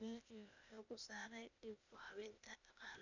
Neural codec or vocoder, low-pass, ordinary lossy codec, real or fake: codec, 16 kHz, 0.8 kbps, ZipCodec; 7.2 kHz; none; fake